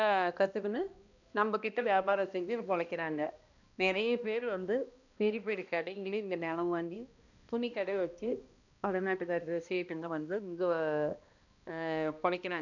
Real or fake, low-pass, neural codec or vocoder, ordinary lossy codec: fake; 7.2 kHz; codec, 16 kHz, 1 kbps, X-Codec, HuBERT features, trained on balanced general audio; none